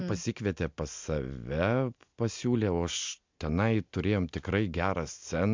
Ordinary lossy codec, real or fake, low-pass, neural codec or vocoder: MP3, 64 kbps; real; 7.2 kHz; none